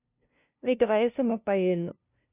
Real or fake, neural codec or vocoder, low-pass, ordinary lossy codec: fake; codec, 16 kHz, 0.5 kbps, FunCodec, trained on LibriTTS, 25 frames a second; 3.6 kHz; none